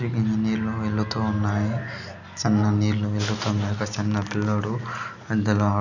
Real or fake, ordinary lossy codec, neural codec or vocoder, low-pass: real; none; none; 7.2 kHz